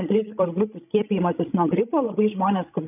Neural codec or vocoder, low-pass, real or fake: codec, 16 kHz, 16 kbps, FreqCodec, larger model; 3.6 kHz; fake